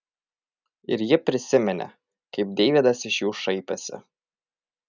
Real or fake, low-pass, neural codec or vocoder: real; 7.2 kHz; none